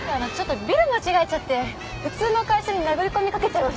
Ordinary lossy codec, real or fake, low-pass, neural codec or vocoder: none; real; none; none